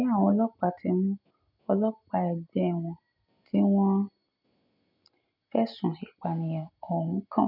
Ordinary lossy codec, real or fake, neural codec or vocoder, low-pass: none; real; none; 5.4 kHz